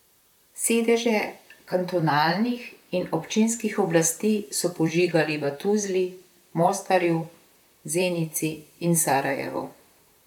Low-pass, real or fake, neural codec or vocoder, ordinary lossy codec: 19.8 kHz; fake; vocoder, 44.1 kHz, 128 mel bands, Pupu-Vocoder; none